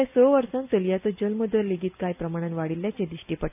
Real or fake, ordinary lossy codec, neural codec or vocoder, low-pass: real; none; none; 3.6 kHz